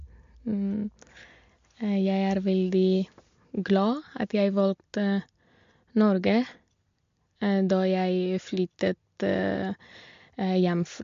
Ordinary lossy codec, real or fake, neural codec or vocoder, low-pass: MP3, 48 kbps; real; none; 7.2 kHz